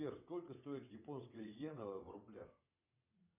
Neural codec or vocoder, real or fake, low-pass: vocoder, 22.05 kHz, 80 mel bands, WaveNeXt; fake; 3.6 kHz